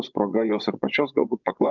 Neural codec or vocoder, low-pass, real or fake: vocoder, 24 kHz, 100 mel bands, Vocos; 7.2 kHz; fake